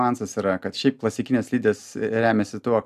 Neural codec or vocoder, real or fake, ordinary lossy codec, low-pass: none; real; AAC, 96 kbps; 14.4 kHz